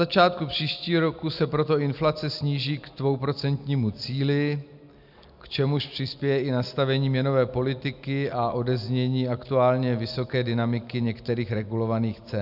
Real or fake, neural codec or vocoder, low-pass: real; none; 5.4 kHz